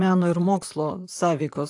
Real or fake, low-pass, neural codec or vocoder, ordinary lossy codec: fake; 10.8 kHz; vocoder, 44.1 kHz, 128 mel bands, Pupu-Vocoder; AAC, 64 kbps